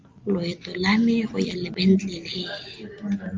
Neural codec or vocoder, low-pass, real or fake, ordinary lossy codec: none; 7.2 kHz; real; Opus, 32 kbps